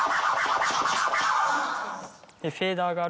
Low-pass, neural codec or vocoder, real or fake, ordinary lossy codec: none; none; real; none